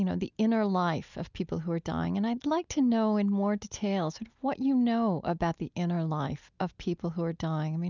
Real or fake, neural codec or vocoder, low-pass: real; none; 7.2 kHz